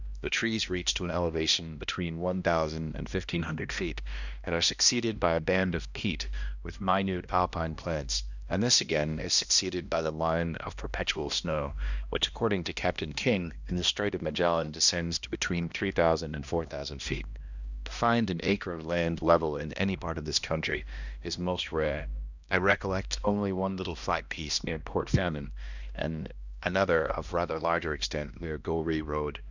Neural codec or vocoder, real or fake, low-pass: codec, 16 kHz, 1 kbps, X-Codec, HuBERT features, trained on balanced general audio; fake; 7.2 kHz